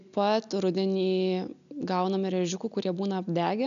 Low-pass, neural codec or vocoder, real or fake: 7.2 kHz; none; real